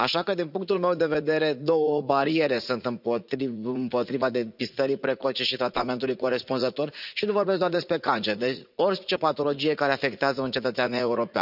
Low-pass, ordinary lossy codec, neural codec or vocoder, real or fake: 5.4 kHz; none; vocoder, 44.1 kHz, 80 mel bands, Vocos; fake